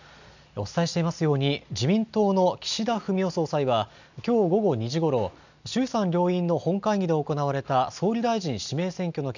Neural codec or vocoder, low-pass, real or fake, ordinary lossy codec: none; 7.2 kHz; real; none